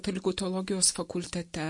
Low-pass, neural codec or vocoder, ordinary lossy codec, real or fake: 10.8 kHz; none; MP3, 48 kbps; real